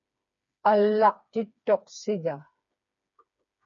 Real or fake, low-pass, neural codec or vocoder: fake; 7.2 kHz; codec, 16 kHz, 4 kbps, FreqCodec, smaller model